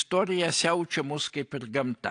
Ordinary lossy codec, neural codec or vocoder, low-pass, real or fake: AAC, 48 kbps; none; 9.9 kHz; real